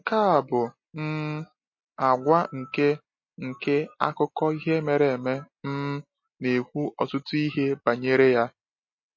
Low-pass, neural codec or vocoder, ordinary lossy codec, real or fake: 7.2 kHz; none; MP3, 32 kbps; real